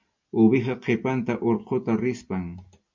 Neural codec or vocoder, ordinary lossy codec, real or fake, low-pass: none; MP3, 48 kbps; real; 7.2 kHz